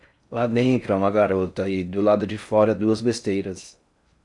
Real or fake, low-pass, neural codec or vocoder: fake; 10.8 kHz; codec, 16 kHz in and 24 kHz out, 0.6 kbps, FocalCodec, streaming, 4096 codes